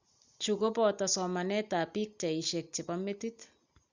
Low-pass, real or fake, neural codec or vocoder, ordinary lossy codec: 7.2 kHz; real; none; Opus, 64 kbps